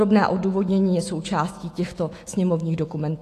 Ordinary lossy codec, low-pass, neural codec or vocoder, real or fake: AAC, 48 kbps; 14.4 kHz; autoencoder, 48 kHz, 128 numbers a frame, DAC-VAE, trained on Japanese speech; fake